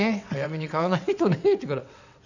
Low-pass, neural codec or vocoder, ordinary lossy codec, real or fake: 7.2 kHz; vocoder, 22.05 kHz, 80 mel bands, WaveNeXt; none; fake